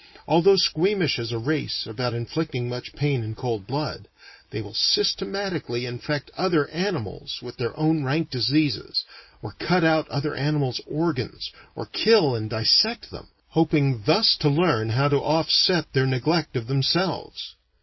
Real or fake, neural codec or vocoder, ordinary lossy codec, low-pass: real; none; MP3, 24 kbps; 7.2 kHz